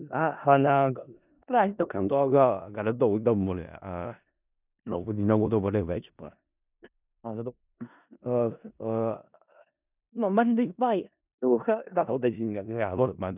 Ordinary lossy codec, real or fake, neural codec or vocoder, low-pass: none; fake; codec, 16 kHz in and 24 kHz out, 0.4 kbps, LongCat-Audio-Codec, four codebook decoder; 3.6 kHz